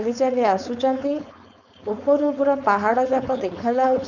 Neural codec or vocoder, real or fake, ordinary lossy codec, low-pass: codec, 16 kHz, 4.8 kbps, FACodec; fake; none; 7.2 kHz